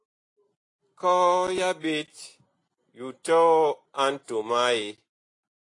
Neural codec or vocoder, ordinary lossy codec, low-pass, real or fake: none; AAC, 48 kbps; 10.8 kHz; real